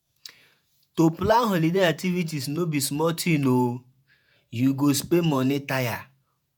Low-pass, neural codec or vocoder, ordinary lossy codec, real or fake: none; vocoder, 48 kHz, 128 mel bands, Vocos; none; fake